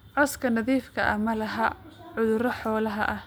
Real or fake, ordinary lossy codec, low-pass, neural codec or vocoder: real; none; none; none